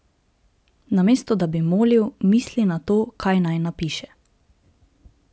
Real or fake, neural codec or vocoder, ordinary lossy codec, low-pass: real; none; none; none